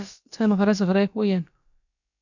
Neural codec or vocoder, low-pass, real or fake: codec, 16 kHz, about 1 kbps, DyCAST, with the encoder's durations; 7.2 kHz; fake